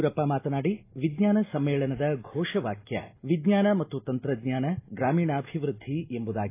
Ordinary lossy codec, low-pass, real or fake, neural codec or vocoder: AAC, 24 kbps; 3.6 kHz; real; none